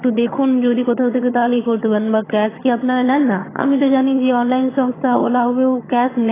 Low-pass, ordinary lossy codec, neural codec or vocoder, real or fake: 3.6 kHz; AAC, 16 kbps; vocoder, 22.05 kHz, 80 mel bands, HiFi-GAN; fake